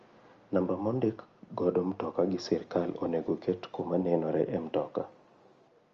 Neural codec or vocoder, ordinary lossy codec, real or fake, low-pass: none; Opus, 32 kbps; real; 7.2 kHz